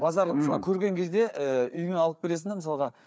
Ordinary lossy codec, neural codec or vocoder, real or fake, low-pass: none; codec, 16 kHz, 4 kbps, FreqCodec, larger model; fake; none